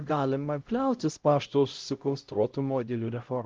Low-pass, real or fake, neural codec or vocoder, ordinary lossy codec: 7.2 kHz; fake; codec, 16 kHz, 0.5 kbps, X-Codec, HuBERT features, trained on LibriSpeech; Opus, 24 kbps